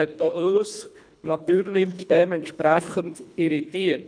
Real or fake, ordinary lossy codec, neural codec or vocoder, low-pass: fake; none; codec, 24 kHz, 1.5 kbps, HILCodec; 9.9 kHz